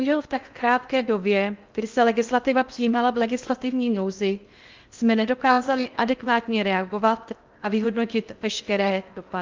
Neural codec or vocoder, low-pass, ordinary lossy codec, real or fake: codec, 16 kHz in and 24 kHz out, 0.6 kbps, FocalCodec, streaming, 2048 codes; 7.2 kHz; Opus, 24 kbps; fake